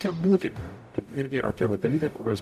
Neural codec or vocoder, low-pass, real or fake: codec, 44.1 kHz, 0.9 kbps, DAC; 14.4 kHz; fake